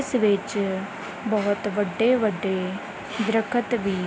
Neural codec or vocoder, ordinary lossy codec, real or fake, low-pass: none; none; real; none